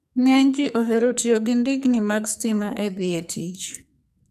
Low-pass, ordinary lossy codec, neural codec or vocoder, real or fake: 14.4 kHz; none; codec, 44.1 kHz, 2.6 kbps, SNAC; fake